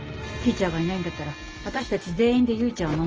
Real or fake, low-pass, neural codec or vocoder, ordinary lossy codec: real; 7.2 kHz; none; Opus, 24 kbps